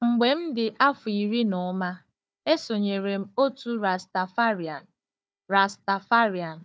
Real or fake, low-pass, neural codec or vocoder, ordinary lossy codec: fake; none; codec, 16 kHz, 4 kbps, FunCodec, trained on Chinese and English, 50 frames a second; none